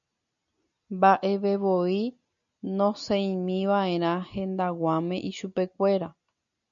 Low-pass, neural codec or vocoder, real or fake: 7.2 kHz; none; real